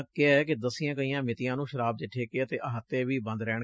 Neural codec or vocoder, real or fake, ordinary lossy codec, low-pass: none; real; none; none